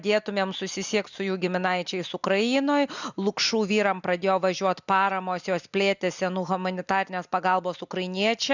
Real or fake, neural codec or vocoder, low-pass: real; none; 7.2 kHz